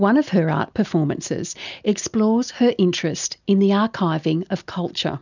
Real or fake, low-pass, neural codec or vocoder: real; 7.2 kHz; none